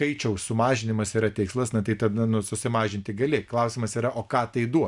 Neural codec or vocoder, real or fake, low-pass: none; real; 10.8 kHz